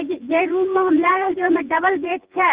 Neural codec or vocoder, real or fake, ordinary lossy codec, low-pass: vocoder, 24 kHz, 100 mel bands, Vocos; fake; Opus, 24 kbps; 3.6 kHz